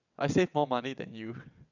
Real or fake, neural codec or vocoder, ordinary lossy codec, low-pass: fake; vocoder, 22.05 kHz, 80 mel bands, WaveNeXt; none; 7.2 kHz